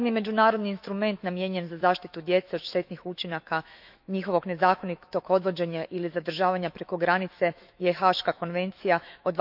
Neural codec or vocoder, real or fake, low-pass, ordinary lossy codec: autoencoder, 48 kHz, 128 numbers a frame, DAC-VAE, trained on Japanese speech; fake; 5.4 kHz; none